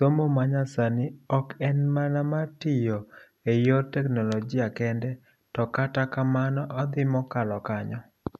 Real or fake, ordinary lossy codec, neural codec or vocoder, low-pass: real; none; none; 14.4 kHz